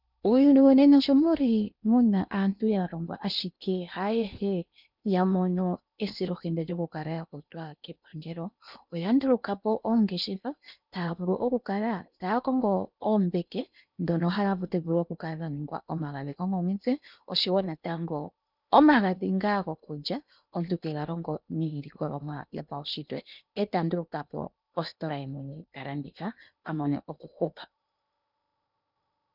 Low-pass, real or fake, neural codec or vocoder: 5.4 kHz; fake; codec, 16 kHz in and 24 kHz out, 0.8 kbps, FocalCodec, streaming, 65536 codes